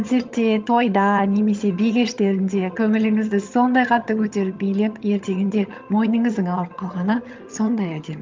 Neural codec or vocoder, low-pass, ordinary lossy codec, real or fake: vocoder, 22.05 kHz, 80 mel bands, HiFi-GAN; 7.2 kHz; Opus, 24 kbps; fake